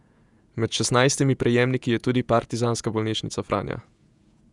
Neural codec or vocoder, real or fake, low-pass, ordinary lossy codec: vocoder, 48 kHz, 128 mel bands, Vocos; fake; 10.8 kHz; none